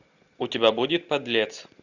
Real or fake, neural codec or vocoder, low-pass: real; none; 7.2 kHz